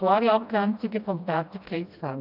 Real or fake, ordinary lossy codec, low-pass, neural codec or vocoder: fake; none; 5.4 kHz; codec, 16 kHz, 1 kbps, FreqCodec, smaller model